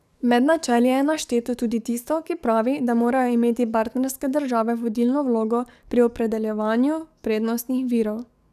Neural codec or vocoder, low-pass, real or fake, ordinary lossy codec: codec, 44.1 kHz, 7.8 kbps, DAC; 14.4 kHz; fake; none